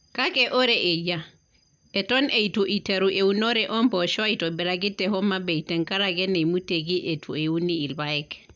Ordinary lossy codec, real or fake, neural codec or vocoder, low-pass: none; real; none; 7.2 kHz